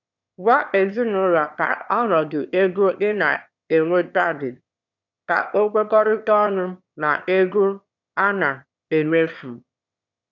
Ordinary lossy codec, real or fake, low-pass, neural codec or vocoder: none; fake; 7.2 kHz; autoencoder, 22.05 kHz, a latent of 192 numbers a frame, VITS, trained on one speaker